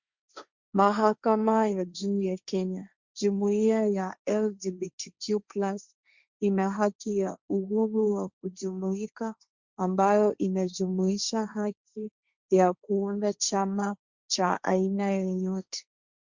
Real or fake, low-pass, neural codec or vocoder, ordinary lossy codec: fake; 7.2 kHz; codec, 16 kHz, 1.1 kbps, Voila-Tokenizer; Opus, 64 kbps